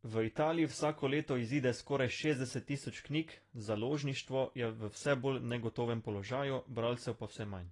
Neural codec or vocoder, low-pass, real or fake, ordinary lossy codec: none; 10.8 kHz; real; AAC, 32 kbps